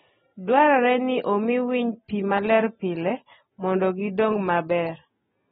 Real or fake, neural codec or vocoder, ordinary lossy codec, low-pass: real; none; AAC, 16 kbps; 7.2 kHz